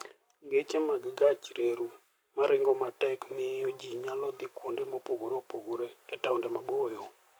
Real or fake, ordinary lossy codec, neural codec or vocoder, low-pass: fake; none; codec, 44.1 kHz, 7.8 kbps, Pupu-Codec; none